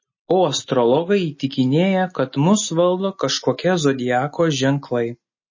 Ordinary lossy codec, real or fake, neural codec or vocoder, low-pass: MP3, 32 kbps; real; none; 7.2 kHz